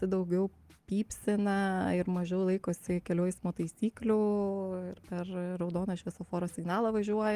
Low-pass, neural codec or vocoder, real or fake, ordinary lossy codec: 14.4 kHz; none; real; Opus, 24 kbps